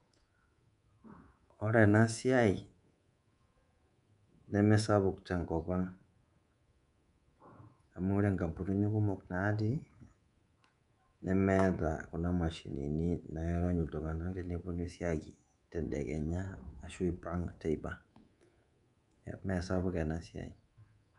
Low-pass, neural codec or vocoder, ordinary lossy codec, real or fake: 10.8 kHz; codec, 24 kHz, 3.1 kbps, DualCodec; MP3, 96 kbps; fake